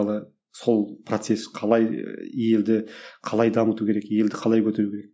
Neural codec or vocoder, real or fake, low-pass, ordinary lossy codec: none; real; none; none